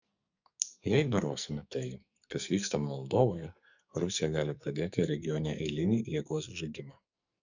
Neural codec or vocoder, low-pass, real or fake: codec, 44.1 kHz, 2.6 kbps, SNAC; 7.2 kHz; fake